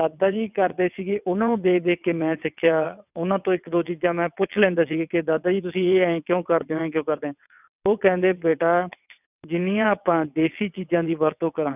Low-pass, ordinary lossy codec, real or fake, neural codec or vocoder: 3.6 kHz; none; fake; vocoder, 22.05 kHz, 80 mel bands, WaveNeXt